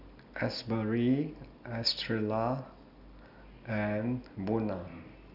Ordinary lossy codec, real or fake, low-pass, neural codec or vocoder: none; real; 5.4 kHz; none